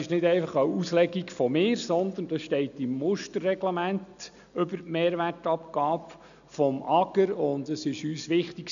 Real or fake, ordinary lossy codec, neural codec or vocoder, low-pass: real; none; none; 7.2 kHz